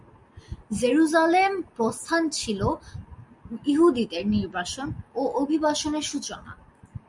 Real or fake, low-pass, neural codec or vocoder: real; 10.8 kHz; none